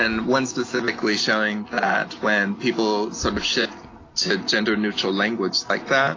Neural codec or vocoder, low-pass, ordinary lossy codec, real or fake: none; 7.2 kHz; AAC, 32 kbps; real